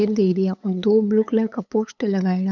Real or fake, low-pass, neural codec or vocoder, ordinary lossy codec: fake; 7.2 kHz; codec, 16 kHz, 8 kbps, FunCodec, trained on LibriTTS, 25 frames a second; none